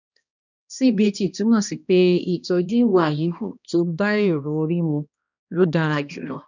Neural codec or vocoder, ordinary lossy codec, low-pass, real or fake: codec, 16 kHz, 1 kbps, X-Codec, HuBERT features, trained on balanced general audio; none; 7.2 kHz; fake